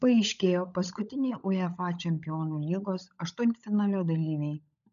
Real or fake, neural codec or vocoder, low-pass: fake; codec, 16 kHz, 16 kbps, FunCodec, trained on LibriTTS, 50 frames a second; 7.2 kHz